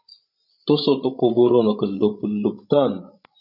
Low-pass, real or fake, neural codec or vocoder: 5.4 kHz; fake; codec, 16 kHz, 16 kbps, FreqCodec, larger model